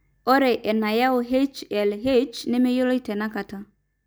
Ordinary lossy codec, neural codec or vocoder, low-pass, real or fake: none; none; none; real